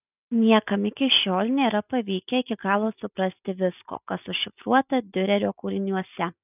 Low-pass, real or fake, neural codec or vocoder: 3.6 kHz; real; none